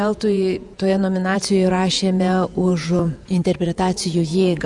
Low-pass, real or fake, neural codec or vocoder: 10.8 kHz; fake; vocoder, 48 kHz, 128 mel bands, Vocos